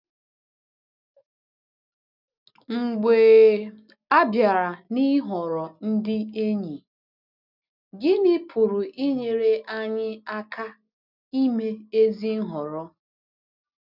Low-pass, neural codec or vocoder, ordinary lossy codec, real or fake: 5.4 kHz; none; none; real